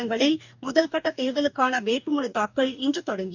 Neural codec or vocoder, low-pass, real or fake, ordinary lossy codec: codec, 44.1 kHz, 2.6 kbps, DAC; 7.2 kHz; fake; none